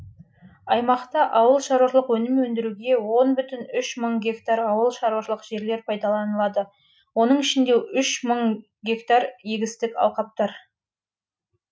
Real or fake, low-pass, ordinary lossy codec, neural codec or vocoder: real; none; none; none